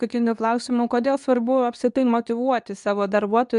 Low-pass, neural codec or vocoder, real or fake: 10.8 kHz; codec, 24 kHz, 0.9 kbps, WavTokenizer, medium speech release version 2; fake